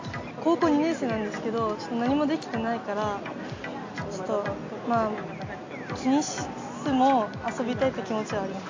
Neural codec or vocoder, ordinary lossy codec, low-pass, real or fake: none; AAC, 48 kbps; 7.2 kHz; real